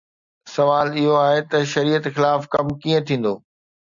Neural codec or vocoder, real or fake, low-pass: none; real; 7.2 kHz